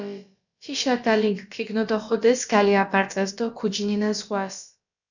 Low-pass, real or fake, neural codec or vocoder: 7.2 kHz; fake; codec, 16 kHz, about 1 kbps, DyCAST, with the encoder's durations